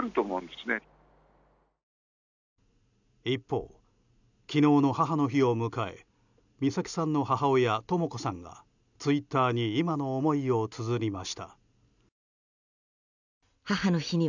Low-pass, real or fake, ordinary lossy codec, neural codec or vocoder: 7.2 kHz; real; none; none